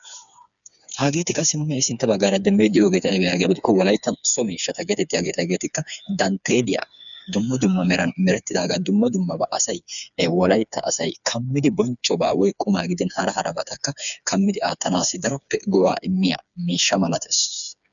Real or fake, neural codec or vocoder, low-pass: fake; codec, 16 kHz, 4 kbps, FreqCodec, smaller model; 7.2 kHz